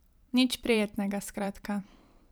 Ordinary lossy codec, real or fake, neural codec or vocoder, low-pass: none; fake; vocoder, 44.1 kHz, 128 mel bands every 512 samples, BigVGAN v2; none